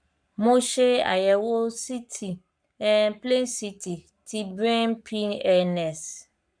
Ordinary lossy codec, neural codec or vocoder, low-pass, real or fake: none; codec, 44.1 kHz, 7.8 kbps, Pupu-Codec; 9.9 kHz; fake